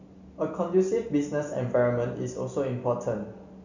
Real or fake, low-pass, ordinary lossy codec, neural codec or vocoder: real; 7.2 kHz; none; none